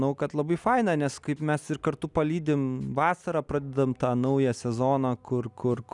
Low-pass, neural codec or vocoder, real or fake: 10.8 kHz; none; real